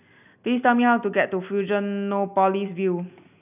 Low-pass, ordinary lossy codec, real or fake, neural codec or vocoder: 3.6 kHz; none; real; none